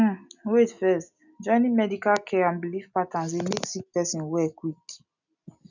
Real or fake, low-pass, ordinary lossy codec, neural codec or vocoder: real; 7.2 kHz; none; none